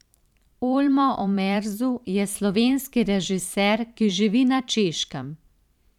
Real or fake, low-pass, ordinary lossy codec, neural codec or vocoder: fake; 19.8 kHz; none; vocoder, 44.1 kHz, 128 mel bands every 512 samples, BigVGAN v2